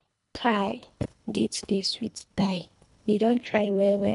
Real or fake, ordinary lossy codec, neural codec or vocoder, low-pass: fake; none; codec, 24 kHz, 1.5 kbps, HILCodec; 10.8 kHz